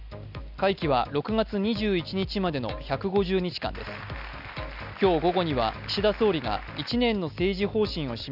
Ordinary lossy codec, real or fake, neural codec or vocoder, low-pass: none; real; none; 5.4 kHz